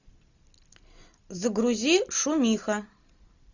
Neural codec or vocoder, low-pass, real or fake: none; 7.2 kHz; real